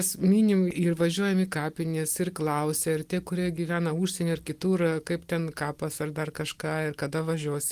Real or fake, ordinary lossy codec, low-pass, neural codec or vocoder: real; Opus, 24 kbps; 14.4 kHz; none